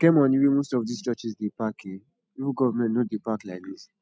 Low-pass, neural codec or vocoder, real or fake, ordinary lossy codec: none; none; real; none